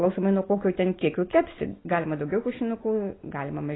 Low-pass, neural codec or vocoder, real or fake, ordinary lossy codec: 7.2 kHz; none; real; AAC, 16 kbps